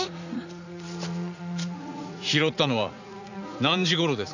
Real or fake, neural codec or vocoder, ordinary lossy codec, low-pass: fake; vocoder, 44.1 kHz, 80 mel bands, Vocos; none; 7.2 kHz